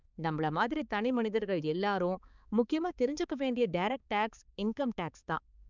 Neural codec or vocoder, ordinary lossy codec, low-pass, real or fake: codec, 16 kHz, 4 kbps, X-Codec, HuBERT features, trained on balanced general audio; none; 7.2 kHz; fake